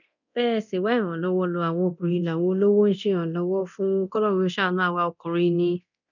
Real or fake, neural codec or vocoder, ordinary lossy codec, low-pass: fake; codec, 24 kHz, 0.9 kbps, DualCodec; none; 7.2 kHz